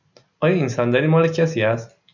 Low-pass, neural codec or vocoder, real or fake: 7.2 kHz; none; real